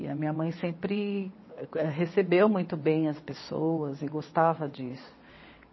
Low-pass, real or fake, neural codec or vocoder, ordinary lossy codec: 7.2 kHz; fake; vocoder, 22.05 kHz, 80 mel bands, WaveNeXt; MP3, 24 kbps